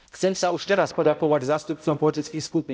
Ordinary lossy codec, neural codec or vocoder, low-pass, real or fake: none; codec, 16 kHz, 0.5 kbps, X-Codec, HuBERT features, trained on balanced general audio; none; fake